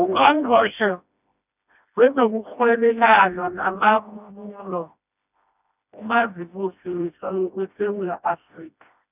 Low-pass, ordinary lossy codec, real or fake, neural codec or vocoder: 3.6 kHz; none; fake; codec, 16 kHz, 1 kbps, FreqCodec, smaller model